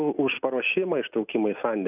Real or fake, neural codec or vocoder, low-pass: real; none; 3.6 kHz